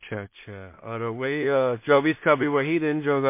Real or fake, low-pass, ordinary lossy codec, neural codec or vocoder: fake; 3.6 kHz; MP3, 24 kbps; codec, 16 kHz in and 24 kHz out, 0.4 kbps, LongCat-Audio-Codec, two codebook decoder